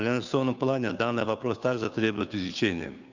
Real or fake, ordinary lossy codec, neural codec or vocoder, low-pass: fake; none; codec, 16 kHz, 2 kbps, FunCodec, trained on Chinese and English, 25 frames a second; 7.2 kHz